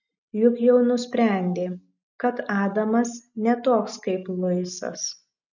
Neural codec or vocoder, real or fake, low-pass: none; real; 7.2 kHz